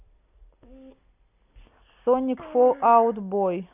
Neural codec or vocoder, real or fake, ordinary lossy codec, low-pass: none; real; none; 3.6 kHz